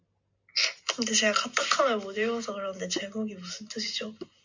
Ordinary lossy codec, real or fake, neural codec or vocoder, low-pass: MP3, 64 kbps; real; none; 7.2 kHz